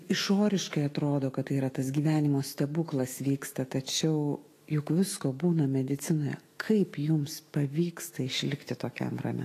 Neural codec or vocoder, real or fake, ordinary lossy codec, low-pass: autoencoder, 48 kHz, 128 numbers a frame, DAC-VAE, trained on Japanese speech; fake; AAC, 48 kbps; 14.4 kHz